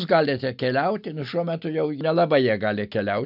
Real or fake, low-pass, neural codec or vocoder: real; 5.4 kHz; none